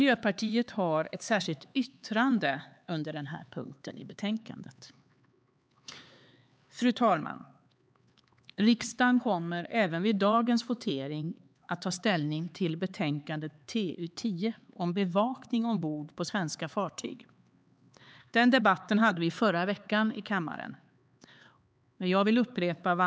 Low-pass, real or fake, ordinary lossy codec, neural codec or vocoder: none; fake; none; codec, 16 kHz, 4 kbps, X-Codec, HuBERT features, trained on LibriSpeech